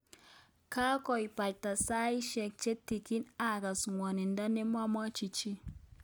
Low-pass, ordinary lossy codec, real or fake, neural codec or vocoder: none; none; real; none